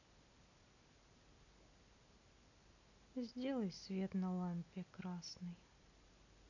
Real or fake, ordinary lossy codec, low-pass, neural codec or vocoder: real; none; 7.2 kHz; none